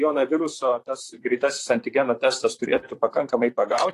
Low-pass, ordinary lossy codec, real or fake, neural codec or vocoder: 14.4 kHz; AAC, 48 kbps; fake; vocoder, 44.1 kHz, 128 mel bands, Pupu-Vocoder